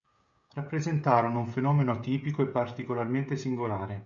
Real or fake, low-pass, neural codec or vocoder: fake; 7.2 kHz; codec, 16 kHz, 16 kbps, FreqCodec, smaller model